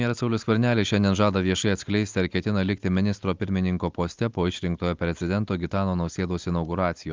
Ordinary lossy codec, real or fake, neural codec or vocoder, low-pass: Opus, 32 kbps; real; none; 7.2 kHz